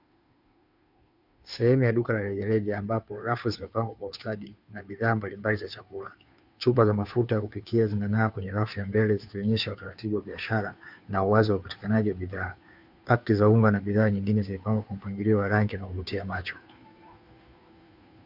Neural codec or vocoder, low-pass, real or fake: codec, 16 kHz, 2 kbps, FunCodec, trained on Chinese and English, 25 frames a second; 5.4 kHz; fake